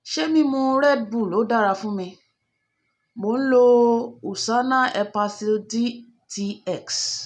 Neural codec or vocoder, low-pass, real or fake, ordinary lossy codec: none; 10.8 kHz; real; none